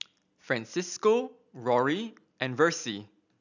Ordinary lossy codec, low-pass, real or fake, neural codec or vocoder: none; 7.2 kHz; real; none